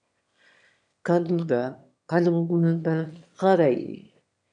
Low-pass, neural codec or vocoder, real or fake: 9.9 kHz; autoencoder, 22.05 kHz, a latent of 192 numbers a frame, VITS, trained on one speaker; fake